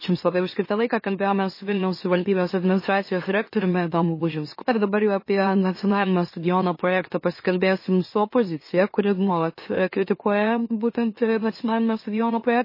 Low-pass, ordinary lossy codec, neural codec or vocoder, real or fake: 5.4 kHz; MP3, 24 kbps; autoencoder, 44.1 kHz, a latent of 192 numbers a frame, MeloTTS; fake